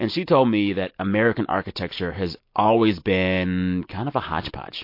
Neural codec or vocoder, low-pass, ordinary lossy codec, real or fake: none; 5.4 kHz; MP3, 32 kbps; real